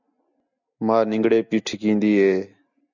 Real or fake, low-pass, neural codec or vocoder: real; 7.2 kHz; none